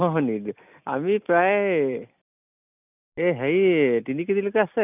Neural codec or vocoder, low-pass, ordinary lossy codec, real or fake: none; 3.6 kHz; none; real